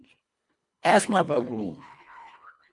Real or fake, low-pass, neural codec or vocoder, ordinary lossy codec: fake; 10.8 kHz; codec, 24 kHz, 1.5 kbps, HILCodec; MP3, 64 kbps